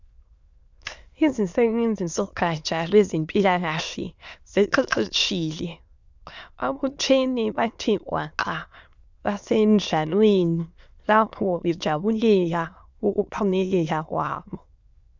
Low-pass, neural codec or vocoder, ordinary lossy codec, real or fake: 7.2 kHz; autoencoder, 22.05 kHz, a latent of 192 numbers a frame, VITS, trained on many speakers; Opus, 64 kbps; fake